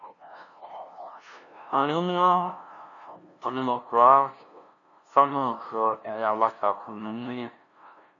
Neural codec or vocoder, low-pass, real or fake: codec, 16 kHz, 0.5 kbps, FunCodec, trained on LibriTTS, 25 frames a second; 7.2 kHz; fake